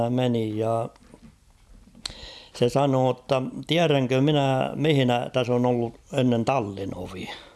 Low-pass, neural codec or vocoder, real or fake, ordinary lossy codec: none; none; real; none